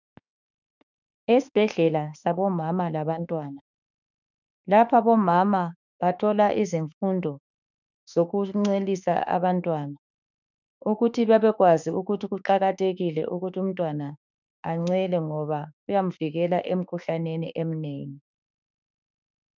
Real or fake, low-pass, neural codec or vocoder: fake; 7.2 kHz; autoencoder, 48 kHz, 32 numbers a frame, DAC-VAE, trained on Japanese speech